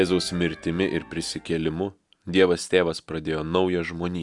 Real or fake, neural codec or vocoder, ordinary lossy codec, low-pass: real; none; Opus, 64 kbps; 10.8 kHz